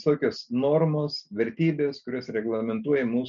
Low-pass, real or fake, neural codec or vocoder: 7.2 kHz; real; none